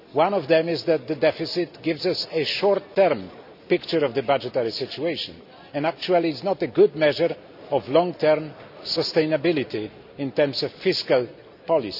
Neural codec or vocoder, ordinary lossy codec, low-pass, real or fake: none; none; 5.4 kHz; real